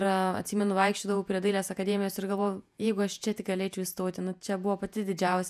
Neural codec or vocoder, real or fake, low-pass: vocoder, 48 kHz, 128 mel bands, Vocos; fake; 14.4 kHz